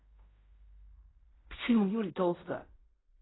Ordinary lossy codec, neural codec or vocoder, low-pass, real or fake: AAC, 16 kbps; codec, 16 kHz in and 24 kHz out, 0.4 kbps, LongCat-Audio-Codec, fine tuned four codebook decoder; 7.2 kHz; fake